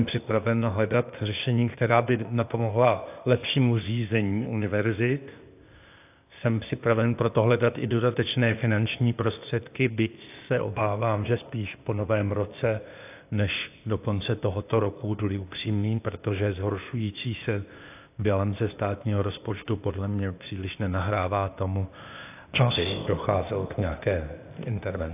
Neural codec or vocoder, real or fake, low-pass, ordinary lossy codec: codec, 16 kHz, 0.8 kbps, ZipCodec; fake; 3.6 kHz; AAC, 32 kbps